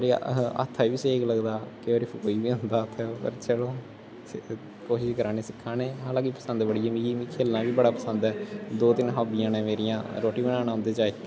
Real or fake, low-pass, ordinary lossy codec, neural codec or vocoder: real; none; none; none